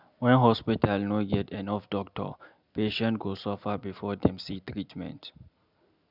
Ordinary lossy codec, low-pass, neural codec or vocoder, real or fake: none; 5.4 kHz; none; real